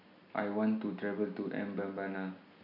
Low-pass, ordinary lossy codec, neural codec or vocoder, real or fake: 5.4 kHz; none; none; real